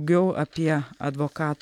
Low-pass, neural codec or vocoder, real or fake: 19.8 kHz; none; real